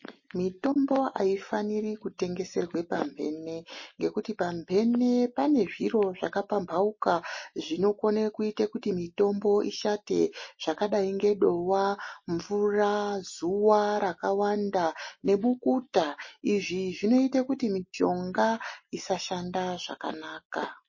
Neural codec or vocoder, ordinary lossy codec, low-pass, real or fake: none; MP3, 32 kbps; 7.2 kHz; real